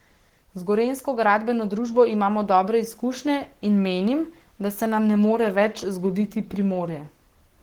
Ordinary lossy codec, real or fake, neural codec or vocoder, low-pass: Opus, 16 kbps; fake; codec, 44.1 kHz, 7.8 kbps, Pupu-Codec; 19.8 kHz